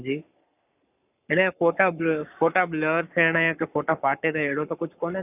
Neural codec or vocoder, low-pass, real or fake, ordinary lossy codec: codec, 44.1 kHz, 7.8 kbps, Pupu-Codec; 3.6 kHz; fake; none